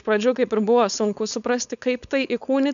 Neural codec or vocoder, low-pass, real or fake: codec, 16 kHz, 4.8 kbps, FACodec; 7.2 kHz; fake